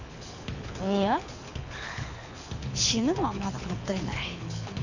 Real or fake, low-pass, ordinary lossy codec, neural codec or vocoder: fake; 7.2 kHz; none; codec, 24 kHz, 6 kbps, HILCodec